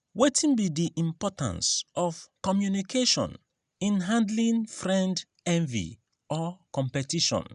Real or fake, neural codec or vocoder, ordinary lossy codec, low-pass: real; none; none; 10.8 kHz